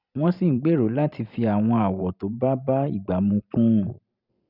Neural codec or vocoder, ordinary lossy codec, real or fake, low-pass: vocoder, 44.1 kHz, 128 mel bands every 512 samples, BigVGAN v2; none; fake; 5.4 kHz